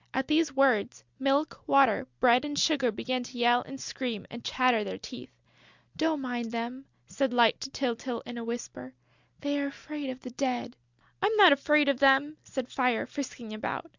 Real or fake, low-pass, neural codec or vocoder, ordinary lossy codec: real; 7.2 kHz; none; Opus, 64 kbps